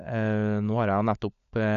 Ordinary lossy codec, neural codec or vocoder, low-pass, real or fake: none; codec, 16 kHz, 16 kbps, FunCodec, trained on LibriTTS, 50 frames a second; 7.2 kHz; fake